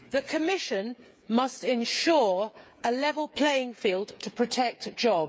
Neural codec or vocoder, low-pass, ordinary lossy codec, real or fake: codec, 16 kHz, 8 kbps, FreqCodec, smaller model; none; none; fake